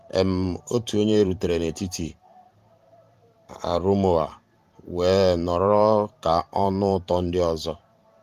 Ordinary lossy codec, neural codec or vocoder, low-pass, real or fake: Opus, 32 kbps; vocoder, 44.1 kHz, 128 mel bands every 512 samples, BigVGAN v2; 14.4 kHz; fake